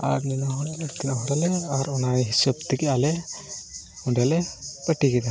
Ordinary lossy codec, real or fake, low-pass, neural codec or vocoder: none; real; none; none